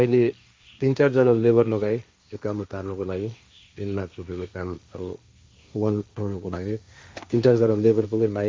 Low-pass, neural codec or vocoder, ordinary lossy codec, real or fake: none; codec, 16 kHz, 1.1 kbps, Voila-Tokenizer; none; fake